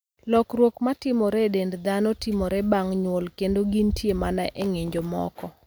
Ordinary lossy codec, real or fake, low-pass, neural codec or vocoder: none; real; none; none